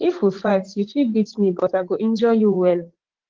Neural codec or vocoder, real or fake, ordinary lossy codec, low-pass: vocoder, 44.1 kHz, 80 mel bands, Vocos; fake; Opus, 16 kbps; 7.2 kHz